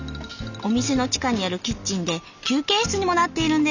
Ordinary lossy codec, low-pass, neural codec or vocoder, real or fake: none; 7.2 kHz; none; real